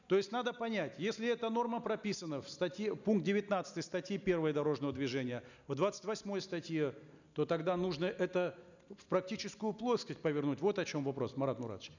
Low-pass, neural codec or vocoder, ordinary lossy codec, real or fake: 7.2 kHz; none; none; real